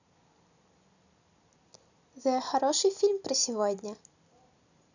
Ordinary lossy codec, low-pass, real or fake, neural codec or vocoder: none; 7.2 kHz; real; none